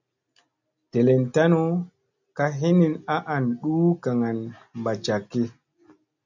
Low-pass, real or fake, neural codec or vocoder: 7.2 kHz; real; none